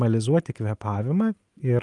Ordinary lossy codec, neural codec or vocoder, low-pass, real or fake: Opus, 32 kbps; none; 10.8 kHz; real